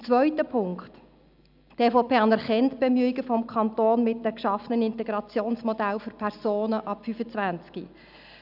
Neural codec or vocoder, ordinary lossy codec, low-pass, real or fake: none; none; 5.4 kHz; real